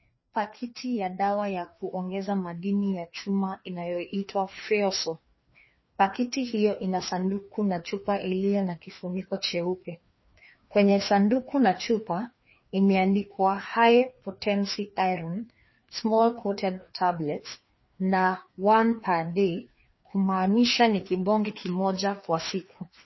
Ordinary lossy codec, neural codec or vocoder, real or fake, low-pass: MP3, 24 kbps; codec, 16 kHz, 2 kbps, FreqCodec, larger model; fake; 7.2 kHz